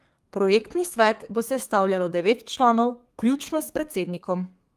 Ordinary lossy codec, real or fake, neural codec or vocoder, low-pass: Opus, 32 kbps; fake; codec, 32 kHz, 1.9 kbps, SNAC; 14.4 kHz